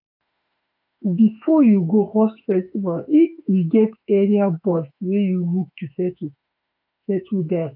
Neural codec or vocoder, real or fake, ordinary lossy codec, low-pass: autoencoder, 48 kHz, 32 numbers a frame, DAC-VAE, trained on Japanese speech; fake; none; 5.4 kHz